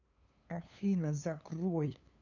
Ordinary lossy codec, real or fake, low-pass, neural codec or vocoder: none; fake; 7.2 kHz; codec, 16 kHz, 2 kbps, FunCodec, trained on LibriTTS, 25 frames a second